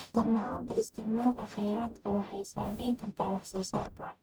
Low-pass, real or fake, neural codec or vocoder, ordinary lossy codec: none; fake; codec, 44.1 kHz, 0.9 kbps, DAC; none